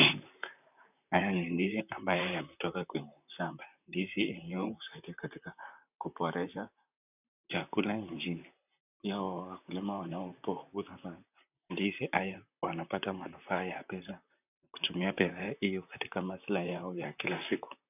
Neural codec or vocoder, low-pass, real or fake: vocoder, 44.1 kHz, 128 mel bands, Pupu-Vocoder; 3.6 kHz; fake